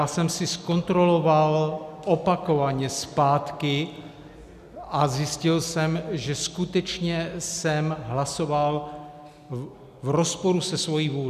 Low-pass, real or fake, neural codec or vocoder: 14.4 kHz; real; none